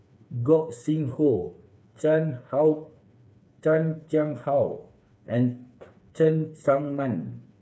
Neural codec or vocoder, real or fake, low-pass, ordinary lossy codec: codec, 16 kHz, 4 kbps, FreqCodec, smaller model; fake; none; none